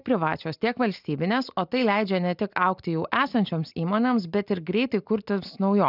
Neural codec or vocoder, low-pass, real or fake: none; 5.4 kHz; real